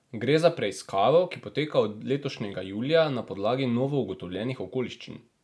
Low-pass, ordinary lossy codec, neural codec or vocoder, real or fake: none; none; none; real